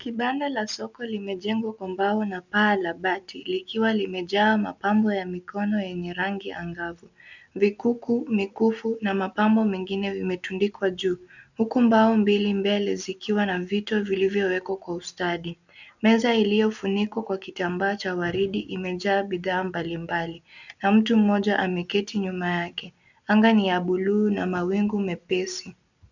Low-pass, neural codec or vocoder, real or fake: 7.2 kHz; none; real